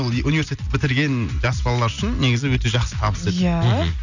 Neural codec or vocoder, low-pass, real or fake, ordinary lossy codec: none; 7.2 kHz; real; none